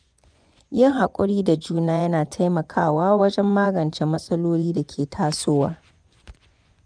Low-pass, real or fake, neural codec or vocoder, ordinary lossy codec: 9.9 kHz; fake; vocoder, 24 kHz, 100 mel bands, Vocos; none